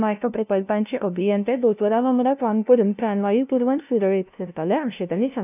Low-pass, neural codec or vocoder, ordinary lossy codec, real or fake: 3.6 kHz; codec, 16 kHz, 0.5 kbps, FunCodec, trained on LibriTTS, 25 frames a second; none; fake